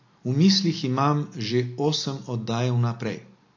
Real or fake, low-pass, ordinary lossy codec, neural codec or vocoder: real; 7.2 kHz; AAC, 48 kbps; none